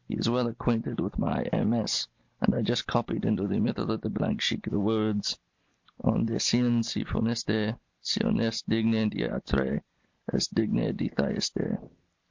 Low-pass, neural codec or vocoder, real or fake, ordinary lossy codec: 7.2 kHz; none; real; MP3, 48 kbps